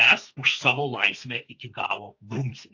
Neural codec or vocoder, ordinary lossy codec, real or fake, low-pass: codec, 32 kHz, 1.9 kbps, SNAC; AAC, 48 kbps; fake; 7.2 kHz